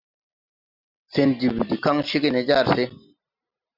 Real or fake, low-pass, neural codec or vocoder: real; 5.4 kHz; none